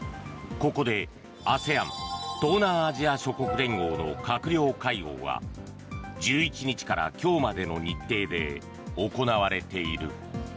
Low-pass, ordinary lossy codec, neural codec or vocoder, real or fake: none; none; none; real